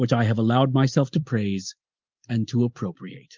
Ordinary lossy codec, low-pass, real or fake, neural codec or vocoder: Opus, 32 kbps; 7.2 kHz; real; none